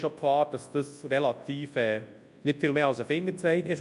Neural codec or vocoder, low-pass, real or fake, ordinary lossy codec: codec, 24 kHz, 0.9 kbps, WavTokenizer, large speech release; 10.8 kHz; fake; MP3, 64 kbps